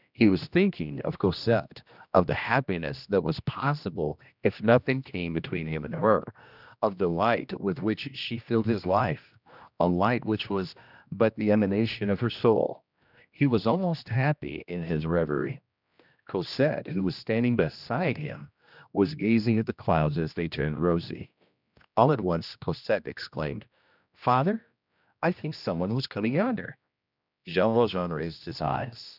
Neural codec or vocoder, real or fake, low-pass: codec, 16 kHz, 1 kbps, X-Codec, HuBERT features, trained on general audio; fake; 5.4 kHz